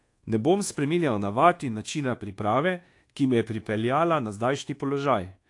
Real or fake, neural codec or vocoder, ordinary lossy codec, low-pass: fake; codec, 24 kHz, 1.2 kbps, DualCodec; AAC, 48 kbps; 10.8 kHz